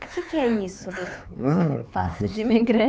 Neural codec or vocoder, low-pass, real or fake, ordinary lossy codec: codec, 16 kHz, 4 kbps, X-Codec, HuBERT features, trained on LibriSpeech; none; fake; none